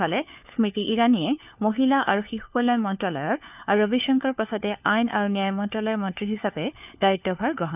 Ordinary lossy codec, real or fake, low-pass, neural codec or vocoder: none; fake; 3.6 kHz; codec, 16 kHz, 4 kbps, FunCodec, trained on LibriTTS, 50 frames a second